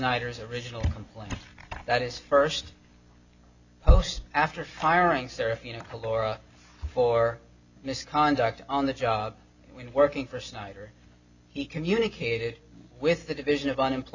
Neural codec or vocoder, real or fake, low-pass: none; real; 7.2 kHz